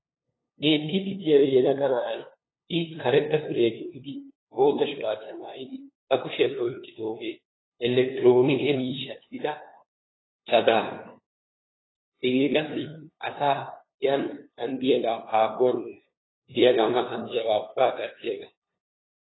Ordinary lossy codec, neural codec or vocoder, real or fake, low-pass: AAC, 16 kbps; codec, 16 kHz, 2 kbps, FunCodec, trained on LibriTTS, 25 frames a second; fake; 7.2 kHz